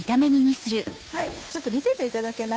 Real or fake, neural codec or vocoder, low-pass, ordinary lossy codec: fake; codec, 16 kHz, 2 kbps, FunCodec, trained on Chinese and English, 25 frames a second; none; none